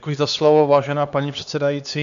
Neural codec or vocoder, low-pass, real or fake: codec, 16 kHz, 2 kbps, X-Codec, HuBERT features, trained on LibriSpeech; 7.2 kHz; fake